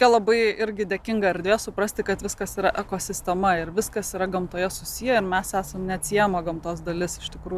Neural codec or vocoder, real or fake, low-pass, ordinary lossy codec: none; real; 14.4 kHz; Opus, 64 kbps